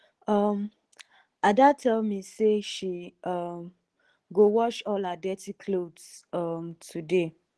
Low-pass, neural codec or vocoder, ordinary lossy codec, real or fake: 10.8 kHz; none; Opus, 16 kbps; real